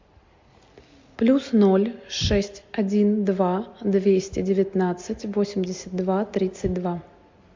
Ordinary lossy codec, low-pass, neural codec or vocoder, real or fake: MP3, 48 kbps; 7.2 kHz; none; real